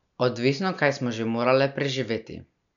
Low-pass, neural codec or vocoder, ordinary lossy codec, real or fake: 7.2 kHz; none; none; real